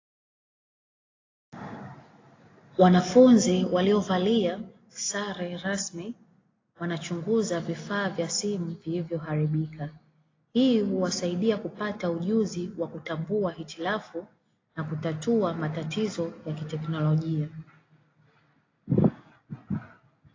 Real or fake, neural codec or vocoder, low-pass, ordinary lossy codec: real; none; 7.2 kHz; AAC, 32 kbps